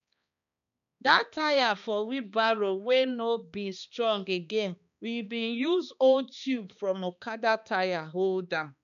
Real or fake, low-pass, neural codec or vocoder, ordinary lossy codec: fake; 7.2 kHz; codec, 16 kHz, 2 kbps, X-Codec, HuBERT features, trained on balanced general audio; none